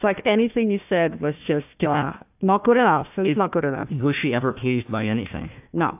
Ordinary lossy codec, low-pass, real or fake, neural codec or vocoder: AAC, 32 kbps; 3.6 kHz; fake; codec, 16 kHz, 1 kbps, FunCodec, trained on Chinese and English, 50 frames a second